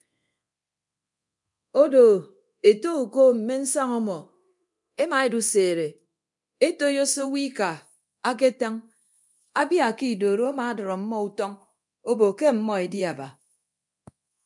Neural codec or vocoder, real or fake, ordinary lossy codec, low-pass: codec, 24 kHz, 0.9 kbps, DualCodec; fake; MP3, 96 kbps; 10.8 kHz